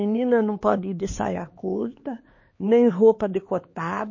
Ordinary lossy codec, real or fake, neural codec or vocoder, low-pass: MP3, 32 kbps; fake; codec, 16 kHz, 4 kbps, X-Codec, HuBERT features, trained on LibriSpeech; 7.2 kHz